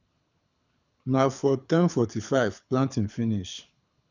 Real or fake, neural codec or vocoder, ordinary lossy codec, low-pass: fake; codec, 24 kHz, 6 kbps, HILCodec; none; 7.2 kHz